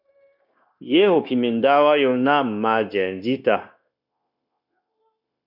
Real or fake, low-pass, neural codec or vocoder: fake; 5.4 kHz; codec, 16 kHz, 0.9 kbps, LongCat-Audio-Codec